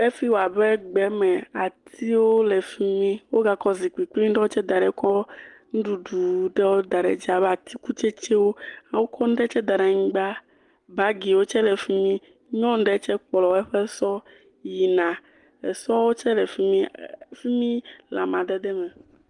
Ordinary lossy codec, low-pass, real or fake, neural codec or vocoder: Opus, 24 kbps; 10.8 kHz; real; none